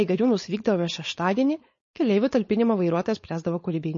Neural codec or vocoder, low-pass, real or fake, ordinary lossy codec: codec, 16 kHz, 4.8 kbps, FACodec; 7.2 kHz; fake; MP3, 32 kbps